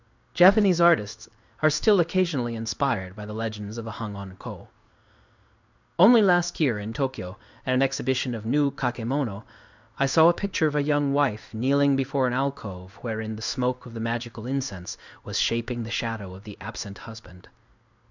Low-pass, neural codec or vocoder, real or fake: 7.2 kHz; codec, 16 kHz in and 24 kHz out, 1 kbps, XY-Tokenizer; fake